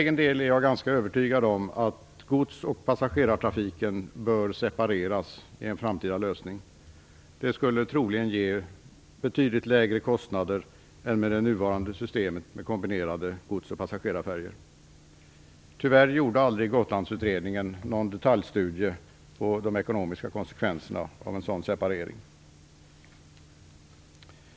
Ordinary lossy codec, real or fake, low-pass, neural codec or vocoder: none; real; none; none